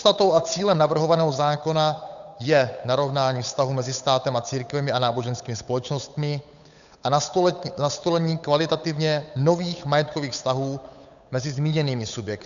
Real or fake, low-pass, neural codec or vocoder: fake; 7.2 kHz; codec, 16 kHz, 8 kbps, FunCodec, trained on Chinese and English, 25 frames a second